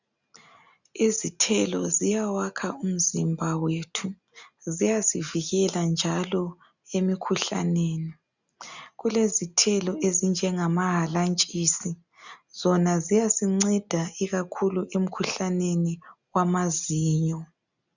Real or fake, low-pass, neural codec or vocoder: real; 7.2 kHz; none